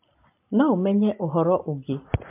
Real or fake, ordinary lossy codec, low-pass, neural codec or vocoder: real; MP3, 32 kbps; 3.6 kHz; none